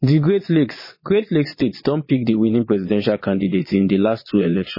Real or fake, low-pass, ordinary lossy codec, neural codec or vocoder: fake; 5.4 kHz; MP3, 24 kbps; vocoder, 22.05 kHz, 80 mel bands, Vocos